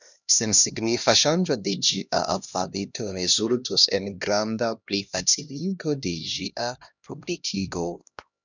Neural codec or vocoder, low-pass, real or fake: codec, 16 kHz, 1 kbps, X-Codec, HuBERT features, trained on LibriSpeech; 7.2 kHz; fake